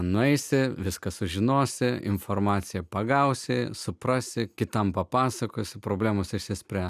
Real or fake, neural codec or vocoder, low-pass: real; none; 14.4 kHz